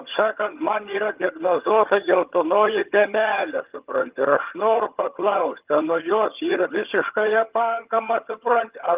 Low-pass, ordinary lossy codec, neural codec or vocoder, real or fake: 3.6 kHz; Opus, 24 kbps; vocoder, 22.05 kHz, 80 mel bands, HiFi-GAN; fake